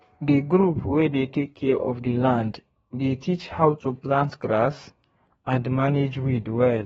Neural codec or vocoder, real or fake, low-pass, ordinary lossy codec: codec, 32 kHz, 1.9 kbps, SNAC; fake; 14.4 kHz; AAC, 24 kbps